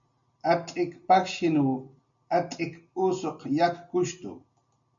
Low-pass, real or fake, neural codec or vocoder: 7.2 kHz; real; none